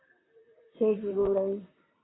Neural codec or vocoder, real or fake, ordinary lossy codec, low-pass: vocoder, 44.1 kHz, 128 mel bands, Pupu-Vocoder; fake; AAC, 16 kbps; 7.2 kHz